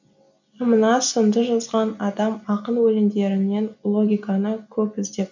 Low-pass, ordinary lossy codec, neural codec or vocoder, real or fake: 7.2 kHz; none; none; real